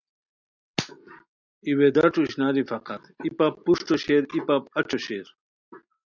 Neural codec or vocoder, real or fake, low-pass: none; real; 7.2 kHz